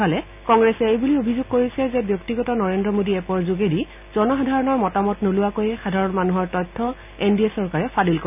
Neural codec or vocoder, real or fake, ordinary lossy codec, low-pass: none; real; none; 3.6 kHz